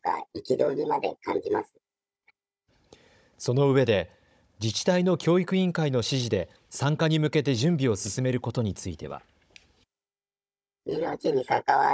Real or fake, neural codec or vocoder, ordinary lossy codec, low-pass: fake; codec, 16 kHz, 16 kbps, FunCodec, trained on Chinese and English, 50 frames a second; none; none